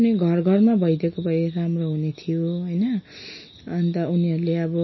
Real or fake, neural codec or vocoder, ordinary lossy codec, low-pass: real; none; MP3, 24 kbps; 7.2 kHz